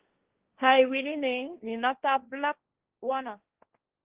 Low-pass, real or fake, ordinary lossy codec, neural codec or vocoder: 3.6 kHz; fake; Opus, 16 kbps; codec, 16 kHz, 1.1 kbps, Voila-Tokenizer